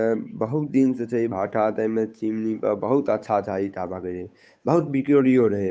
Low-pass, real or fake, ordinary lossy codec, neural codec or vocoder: none; fake; none; codec, 16 kHz, 8 kbps, FunCodec, trained on Chinese and English, 25 frames a second